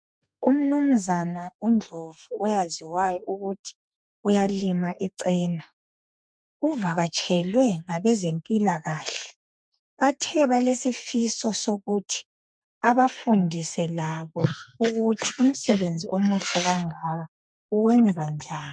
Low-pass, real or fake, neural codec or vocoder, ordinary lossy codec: 9.9 kHz; fake; codec, 32 kHz, 1.9 kbps, SNAC; MP3, 96 kbps